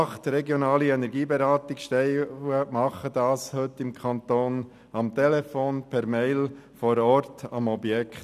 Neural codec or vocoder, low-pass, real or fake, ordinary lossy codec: none; 14.4 kHz; real; none